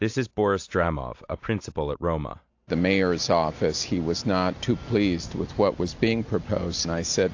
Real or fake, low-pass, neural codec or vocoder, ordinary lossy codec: real; 7.2 kHz; none; AAC, 48 kbps